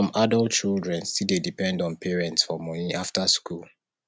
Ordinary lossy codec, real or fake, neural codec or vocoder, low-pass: none; real; none; none